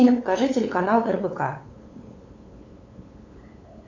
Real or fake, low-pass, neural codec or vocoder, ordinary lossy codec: fake; 7.2 kHz; codec, 16 kHz, 8 kbps, FunCodec, trained on LibriTTS, 25 frames a second; AAC, 32 kbps